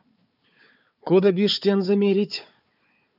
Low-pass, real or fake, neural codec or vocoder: 5.4 kHz; fake; codec, 16 kHz, 4 kbps, FunCodec, trained on Chinese and English, 50 frames a second